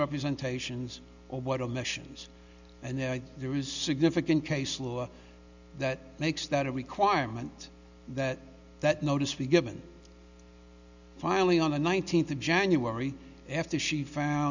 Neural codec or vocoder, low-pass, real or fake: none; 7.2 kHz; real